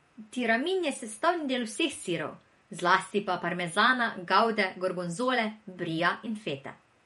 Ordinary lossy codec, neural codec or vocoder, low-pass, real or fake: MP3, 48 kbps; vocoder, 48 kHz, 128 mel bands, Vocos; 19.8 kHz; fake